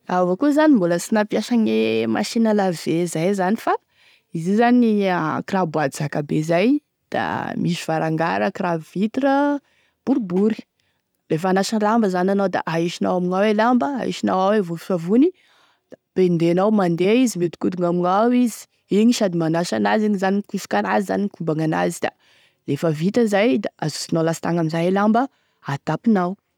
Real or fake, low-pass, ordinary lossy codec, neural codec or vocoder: real; 19.8 kHz; none; none